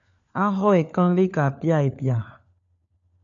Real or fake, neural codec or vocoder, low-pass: fake; codec, 16 kHz, 4 kbps, FunCodec, trained on LibriTTS, 50 frames a second; 7.2 kHz